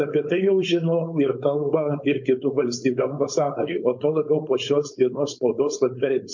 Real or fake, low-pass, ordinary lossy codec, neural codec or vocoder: fake; 7.2 kHz; MP3, 48 kbps; codec, 16 kHz, 4.8 kbps, FACodec